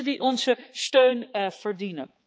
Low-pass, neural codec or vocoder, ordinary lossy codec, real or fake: none; codec, 16 kHz, 4 kbps, X-Codec, HuBERT features, trained on balanced general audio; none; fake